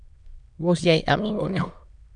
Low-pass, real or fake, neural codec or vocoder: 9.9 kHz; fake; autoencoder, 22.05 kHz, a latent of 192 numbers a frame, VITS, trained on many speakers